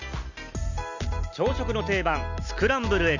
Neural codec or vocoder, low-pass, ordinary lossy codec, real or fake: none; 7.2 kHz; none; real